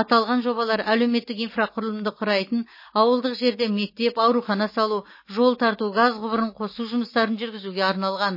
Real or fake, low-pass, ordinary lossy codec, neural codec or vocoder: real; 5.4 kHz; MP3, 24 kbps; none